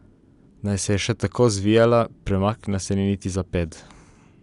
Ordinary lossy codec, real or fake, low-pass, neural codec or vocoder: none; real; 10.8 kHz; none